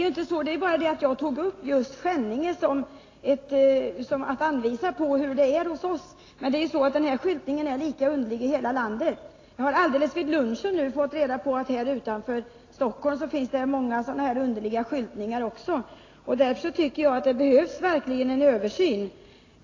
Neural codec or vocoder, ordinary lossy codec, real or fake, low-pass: none; AAC, 32 kbps; real; 7.2 kHz